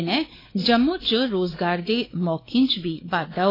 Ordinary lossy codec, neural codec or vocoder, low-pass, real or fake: AAC, 24 kbps; codec, 16 kHz, 4 kbps, X-Codec, WavLM features, trained on Multilingual LibriSpeech; 5.4 kHz; fake